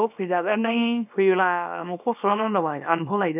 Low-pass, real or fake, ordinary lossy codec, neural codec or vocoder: 3.6 kHz; fake; none; codec, 24 kHz, 0.9 kbps, WavTokenizer, small release